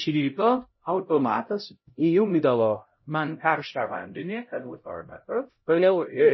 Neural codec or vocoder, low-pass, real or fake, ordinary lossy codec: codec, 16 kHz, 0.5 kbps, X-Codec, HuBERT features, trained on LibriSpeech; 7.2 kHz; fake; MP3, 24 kbps